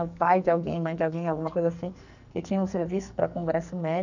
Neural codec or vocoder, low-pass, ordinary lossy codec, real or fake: codec, 44.1 kHz, 2.6 kbps, SNAC; 7.2 kHz; none; fake